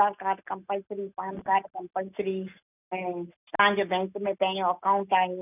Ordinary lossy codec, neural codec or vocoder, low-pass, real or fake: none; none; 3.6 kHz; real